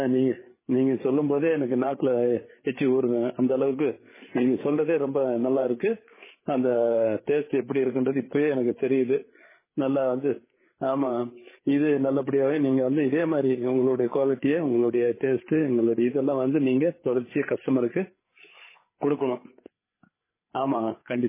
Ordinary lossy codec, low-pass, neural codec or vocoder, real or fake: MP3, 16 kbps; 3.6 kHz; codec, 16 kHz, 8 kbps, FreqCodec, larger model; fake